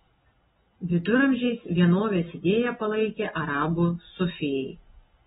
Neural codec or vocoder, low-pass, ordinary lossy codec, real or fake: none; 19.8 kHz; AAC, 16 kbps; real